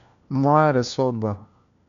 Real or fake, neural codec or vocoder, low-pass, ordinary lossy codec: fake; codec, 16 kHz, 1 kbps, FunCodec, trained on LibriTTS, 50 frames a second; 7.2 kHz; none